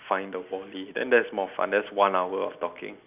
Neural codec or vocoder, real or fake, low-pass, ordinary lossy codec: none; real; 3.6 kHz; none